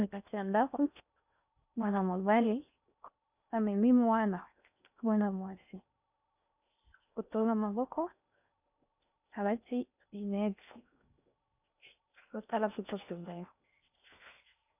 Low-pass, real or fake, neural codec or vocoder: 3.6 kHz; fake; codec, 16 kHz in and 24 kHz out, 0.6 kbps, FocalCodec, streaming, 4096 codes